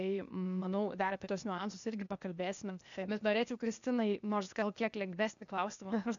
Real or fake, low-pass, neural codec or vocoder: fake; 7.2 kHz; codec, 16 kHz, 0.8 kbps, ZipCodec